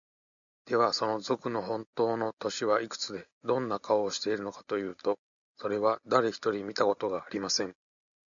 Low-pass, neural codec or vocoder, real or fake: 7.2 kHz; none; real